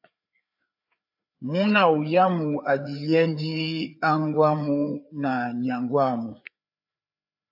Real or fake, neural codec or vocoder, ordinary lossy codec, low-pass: fake; codec, 16 kHz, 4 kbps, FreqCodec, larger model; AAC, 48 kbps; 5.4 kHz